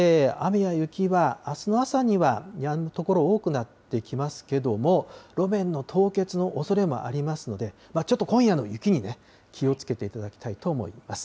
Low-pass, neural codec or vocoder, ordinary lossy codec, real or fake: none; none; none; real